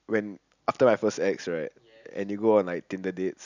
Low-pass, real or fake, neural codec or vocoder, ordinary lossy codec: 7.2 kHz; real; none; none